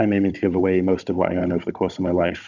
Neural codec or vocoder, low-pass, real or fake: codec, 16 kHz, 16 kbps, FunCodec, trained on Chinese and English, 50 frames a second; 7.2 kHz; fake